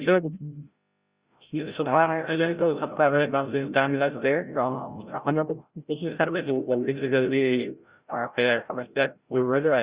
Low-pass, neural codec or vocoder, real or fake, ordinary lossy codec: 3.6 kHz; codec, 16 kHz, 0.5 kbps, FreqCodec, larger model; fake; Opus, 64 kbps